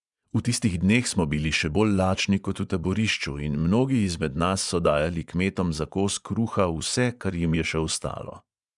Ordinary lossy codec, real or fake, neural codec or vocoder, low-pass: none; fake; vocoder, 24 kHz, 100 mel bands, Vocos; 10.8 kHz